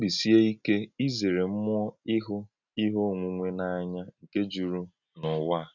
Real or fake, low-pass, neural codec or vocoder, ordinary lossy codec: real; 7.2 kHz; none; none